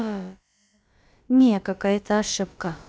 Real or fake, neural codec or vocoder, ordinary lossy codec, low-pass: fake; codec, 16 kHz, about 1 kbps, DyCAST, with the encoder's durations; none; none